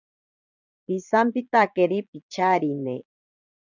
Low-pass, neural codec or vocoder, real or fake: 7.2 kHz; vocoder, 22.05 kHz, 80 mel bands, Vocos; fake